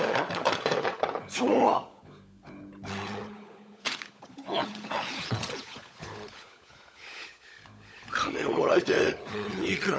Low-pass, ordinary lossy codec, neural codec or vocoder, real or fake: none; none; codec, 16 kHz, 16 kbps, FunCodec, trained on LibriTTS, 50 frames a second; fake